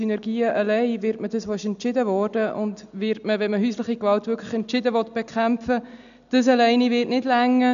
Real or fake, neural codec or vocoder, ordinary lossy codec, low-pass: real; none; none; 7.2 kHz